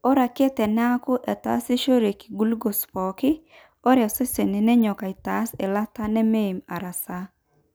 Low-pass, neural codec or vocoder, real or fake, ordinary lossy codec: none; none; real; none